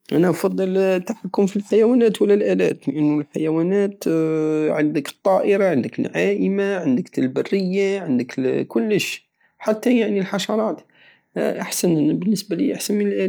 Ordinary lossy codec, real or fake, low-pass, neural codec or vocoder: none; real; none; none